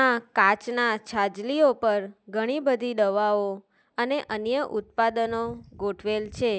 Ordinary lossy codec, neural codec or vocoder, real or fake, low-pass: none; none; real; none